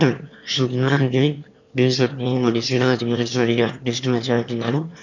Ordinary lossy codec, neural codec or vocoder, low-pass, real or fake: none; autoencoder, 22.05 kHz, a latent of 192 numbers a frame, VITS, trained on one speaker; 7.2 kHz; fake